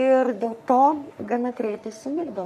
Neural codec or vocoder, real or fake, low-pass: codec, 44.1 kHz, 3.4 kbps, Pupu-Codec; fake; 14.4 kHz